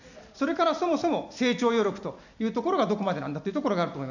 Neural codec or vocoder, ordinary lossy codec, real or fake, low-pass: none; none; real; 7.2 kHz